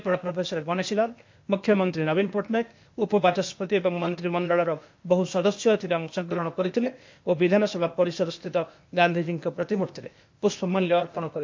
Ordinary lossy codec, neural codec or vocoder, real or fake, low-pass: MP3, 48 kbps; codec, 16 kHz, 0.8 kbps, ZipCodec; fake; 7.2 kHz